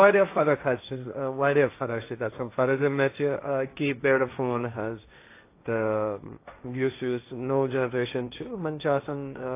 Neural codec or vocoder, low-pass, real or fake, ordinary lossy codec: codec, 16 kHz, 1.1 kbps, Voila-Tokenizer; 3.6 kHz; fake; AAC, 24 kbps